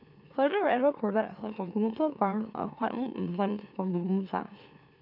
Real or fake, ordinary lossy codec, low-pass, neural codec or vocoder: fake; none; 5.4 kHz; autoencoder, 44.1 kHz, a latent of 192 numbers a frame, MeloTTS